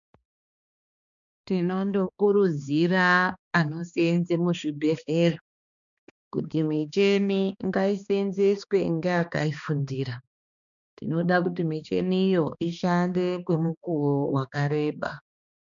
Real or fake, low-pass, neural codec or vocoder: fake; 7.2 kHz; codec, 16 kHz, 2 kbps, X-Codec, HuBERT features, trained on balanced general audio